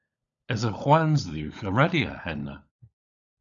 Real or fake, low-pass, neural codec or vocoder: fake; 7.2 kHz; codec, 16 kHz, 16 kbps, FunCodec, trained on LibriTTS, 50 frames a second